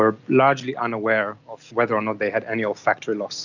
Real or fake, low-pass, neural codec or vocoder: real; 7.2 kHz; none